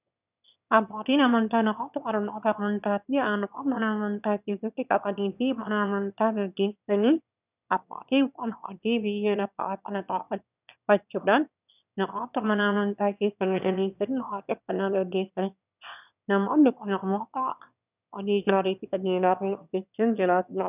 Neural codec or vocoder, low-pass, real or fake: autoencoder, 22.05 kHz, a latent of 192 numbers a frame, VITS, trained on one speaker; 3.6 kHz; fake